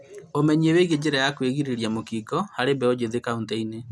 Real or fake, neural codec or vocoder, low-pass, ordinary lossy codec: real; none; none; none